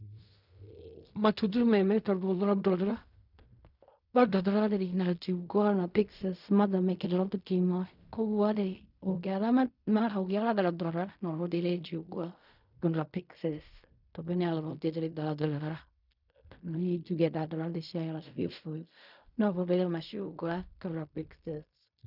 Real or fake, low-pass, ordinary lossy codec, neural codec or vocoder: fake; 5.4 kHz; none; codec, 16 kHz in and 24 kHz out, 0.4 kbps, LongCat-Audio-Codec, fine tuned four codebook decoder